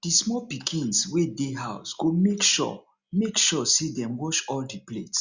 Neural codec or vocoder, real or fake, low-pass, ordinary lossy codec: none; real; 7.2 kHz; Opus, 64 kbps